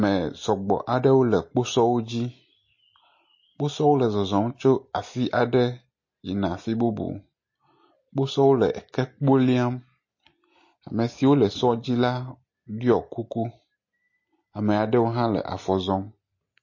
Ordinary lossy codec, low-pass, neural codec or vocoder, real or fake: MP3, 32 kbps; 7.2 kHz; none; real